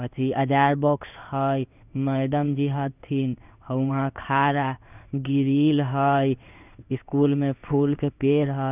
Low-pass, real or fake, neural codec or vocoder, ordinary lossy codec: 3.6 kHz; fake; codec, 16 kHz in and 24 kHz out, 1 kbps, XY-Tokenizer; none